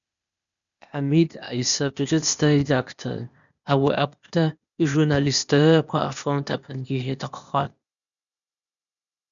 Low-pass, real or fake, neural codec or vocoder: 7.2 kHz; fake; codec, 16 kHz, 0.8 kbps, ZipCodec